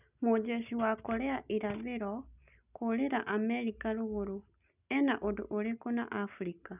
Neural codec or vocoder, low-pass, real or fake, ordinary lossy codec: none; 3.6 kHz; real; none